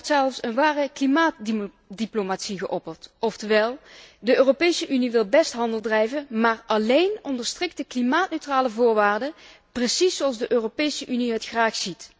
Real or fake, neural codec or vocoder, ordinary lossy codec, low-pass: real; none; none; none